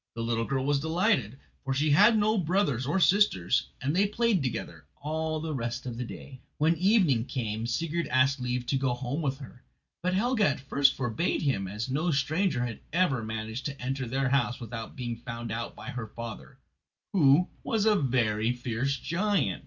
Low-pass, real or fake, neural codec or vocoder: 7.2 kHz; real; none